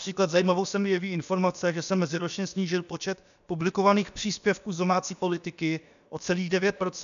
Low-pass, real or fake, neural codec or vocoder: 7.2 kHz; fake; codec, 16 kHz, about 1 kbps, DyCAST, with the encoder's durations